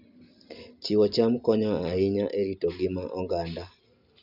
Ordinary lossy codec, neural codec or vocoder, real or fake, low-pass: none; none; real; 5.4 kHz